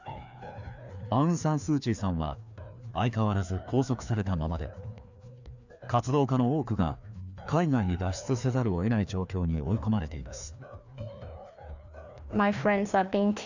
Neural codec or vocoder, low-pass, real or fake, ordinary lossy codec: codec, 16 kHz, 2 kbps, FreqCodec, larger model; 7.2 kHz; fake; none